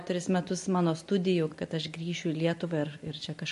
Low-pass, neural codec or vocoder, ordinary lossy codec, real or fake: 14.4 kHz; none; MP3, 48 kbps; real